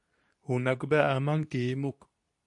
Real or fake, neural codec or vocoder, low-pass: fake; codec, 24 kHz, 0.9 kbps, WavTokenizer, medium speech release version 2; 10.8 kHz